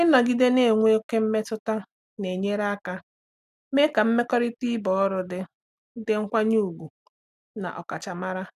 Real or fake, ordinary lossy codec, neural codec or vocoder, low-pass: real; none; none; 14.4 kHz